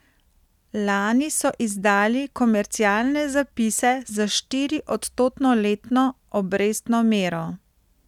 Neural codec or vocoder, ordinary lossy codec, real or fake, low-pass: none; none; real; 19.8 kHz